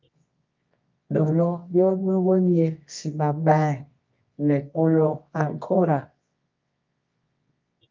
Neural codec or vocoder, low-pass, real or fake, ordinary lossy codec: codec, 24 kHz, 0.9 kbps, WavTokenizer, medium music audio release; 7.2 kHz; fake; Opus, 24 kbps